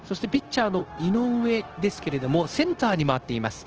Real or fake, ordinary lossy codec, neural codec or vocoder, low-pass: fake; Opus, 16 kbps; codec, 16 kHz, 0.9 kbps, LongCat-Audio-Codec; 7.2 kHz